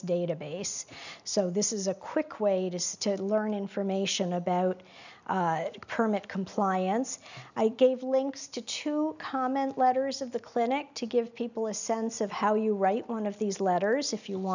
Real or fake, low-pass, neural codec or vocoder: real; 7.2 kHz; none